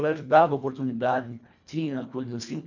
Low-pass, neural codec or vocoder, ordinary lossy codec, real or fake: 7.2 kHz; codec, 24 kHz, 1.5 kbps, HILCodec; none; fake